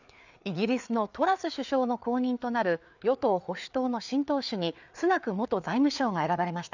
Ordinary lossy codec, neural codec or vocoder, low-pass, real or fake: none; codec, 16 kHz, 4 kbps, FreqCodec, larger model; 7.2 kHz; fake